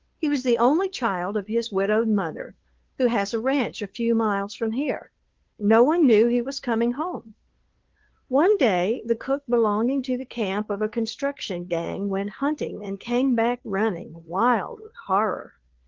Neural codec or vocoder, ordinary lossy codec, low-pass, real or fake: codec, 16 kHz, 2 kbps, FunCodec, trained on Chinese and English, 25 frames a second; Opus, 16 kbps; 7.2 kHz; fake